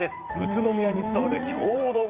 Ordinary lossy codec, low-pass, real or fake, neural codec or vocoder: Opus, 32 kbps; 3.6 kHz; fake; vocoder, 44.1 kHz, 80 mel bands, Vocos